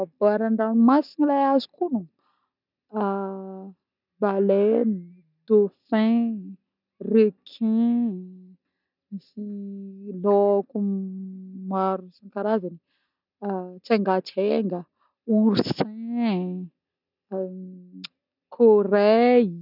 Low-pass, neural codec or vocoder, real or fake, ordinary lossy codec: 5.4 kHz; none; real; none